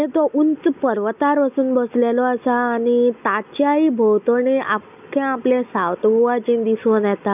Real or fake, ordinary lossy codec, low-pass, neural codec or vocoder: real; none; 3.6 kHz; none